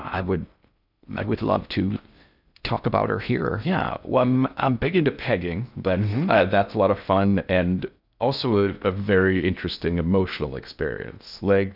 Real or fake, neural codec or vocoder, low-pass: fake; codec, 16 kHz in and 24 kHz out, 0.6 kbps, FocalCodec, streaming, 4096 codes; 5.4 kHz